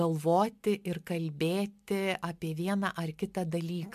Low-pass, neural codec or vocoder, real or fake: 14.4 kHz; none; real